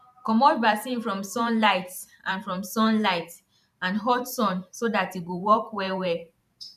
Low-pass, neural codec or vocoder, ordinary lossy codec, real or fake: 14.4 kHz; vocoder, 44.1 kHz, 128 mel bands every 512 samples, BigVGAN v2; none; fake